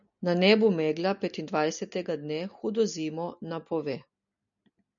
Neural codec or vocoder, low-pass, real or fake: none; 7.2 kHz; real